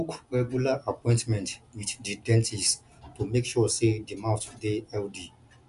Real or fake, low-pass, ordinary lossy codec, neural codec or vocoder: real; 10.8 kHz; none; none